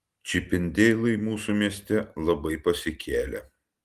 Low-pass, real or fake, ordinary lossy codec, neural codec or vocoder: 14.4 kHz; real; Opus, 24 kbps; none